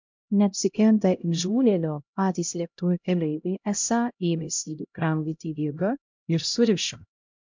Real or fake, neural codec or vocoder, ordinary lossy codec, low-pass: fake; codec, 16 kHz, 0.5 kbps, X-Codec, HuBERT features, trained on LibriSpeech; MP3, 64 kbps; 7.2 kHz